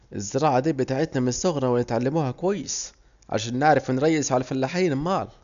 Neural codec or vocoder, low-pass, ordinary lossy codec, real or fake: none; 7.2 kHz; none; real